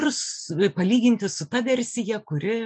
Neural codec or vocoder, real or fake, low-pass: none; real; 9.9 kHz